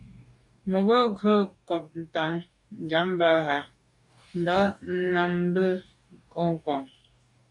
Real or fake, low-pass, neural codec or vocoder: fake; 10.8 kHz; codec, 44.1 kHz, 2.6 kbps, DAC